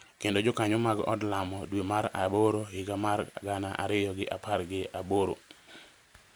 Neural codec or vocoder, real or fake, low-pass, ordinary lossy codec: none; real; none; none